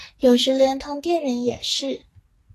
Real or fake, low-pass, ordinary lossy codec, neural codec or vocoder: fake; 14.4 kHz; AAC, 48 kbps; codec, 44.1 kHz, 2.6 kbps, SNAC